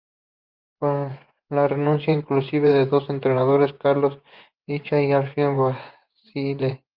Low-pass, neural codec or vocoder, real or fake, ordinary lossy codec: 5.4 kHz; vocoder, 24 kHz, 100 mel bands, Vocos; fake; Opus, 24 kbps